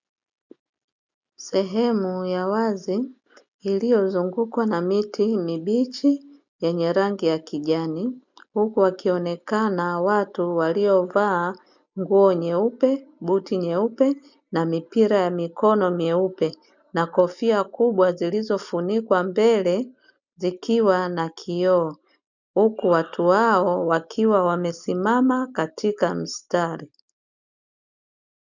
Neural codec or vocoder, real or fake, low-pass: none; real; 7.2 kHz